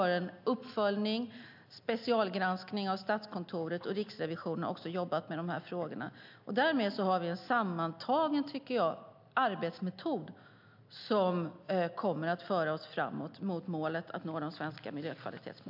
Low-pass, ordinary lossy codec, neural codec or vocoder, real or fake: 5.4 kHz; MP3, 48 kbps; none; real